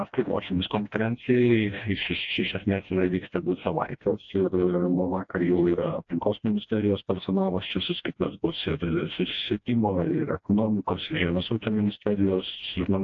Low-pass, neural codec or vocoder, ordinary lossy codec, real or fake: 7.2 kHz; codec, 16 kHz, 1 kbps, FreqCodec, smaller model; AAC, 48 kbps; fake